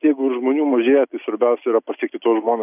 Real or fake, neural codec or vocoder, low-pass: real; none; 3.6 kHz